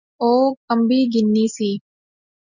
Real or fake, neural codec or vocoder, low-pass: real; none; 7.2 kHz